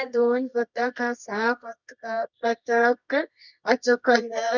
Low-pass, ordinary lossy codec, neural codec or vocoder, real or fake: 7.2 kHz; none; codec, 24 kHz, 0.9 kbps, WavTokenizer, medium music audio release; fake